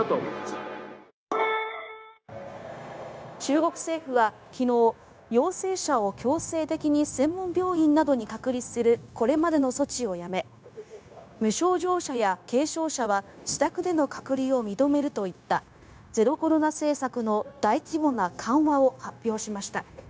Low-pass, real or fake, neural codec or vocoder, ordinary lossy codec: none; fake; codec, 16 kHz, 0.9 kbps, LongCat-Audio-Codec; none